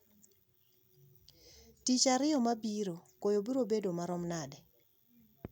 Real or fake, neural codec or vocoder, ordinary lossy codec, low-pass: real; none; none; 19.8 kHz